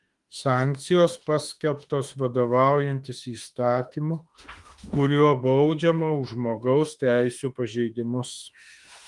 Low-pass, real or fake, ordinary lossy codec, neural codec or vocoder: 10.8 kHz; fake; Opus, 24 kbps; autoencoder, 48 kHz, 32 numbers a frame, DAC-VAE, trained on Japanese speech